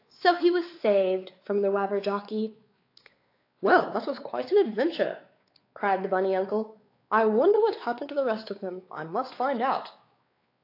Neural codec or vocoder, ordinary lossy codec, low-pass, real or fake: codec, 16 kHz, 4 kbps, X-Codec, WavLM features, trained on Multilingual LibriSpeech; AAC, 32 kbps; 5.4 kHz; fake